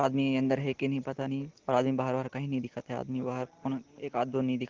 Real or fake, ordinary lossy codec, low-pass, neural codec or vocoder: real; Opus, 16 kbps; 7.2 kHz; none